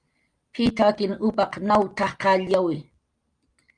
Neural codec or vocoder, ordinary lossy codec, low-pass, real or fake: none; Opus, 32 kbps; 9.9 kHz; real